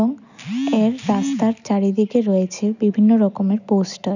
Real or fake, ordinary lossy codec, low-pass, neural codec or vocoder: real; none; 7.2 kHz; none